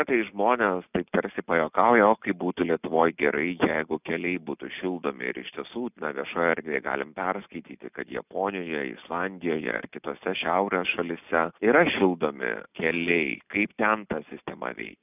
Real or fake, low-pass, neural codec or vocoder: real; 3.6 kHz; none